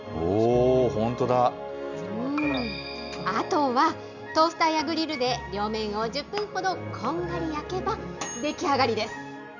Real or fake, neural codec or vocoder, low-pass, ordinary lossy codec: real; none; 7.2 kHz; none